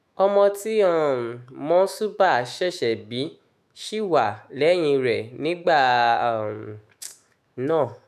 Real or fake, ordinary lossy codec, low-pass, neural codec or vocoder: fake; none; 14.4 kHz; autoencoder, 48 kHz, 128 numbers a frame, DAC-VAE, trained on Japanese speech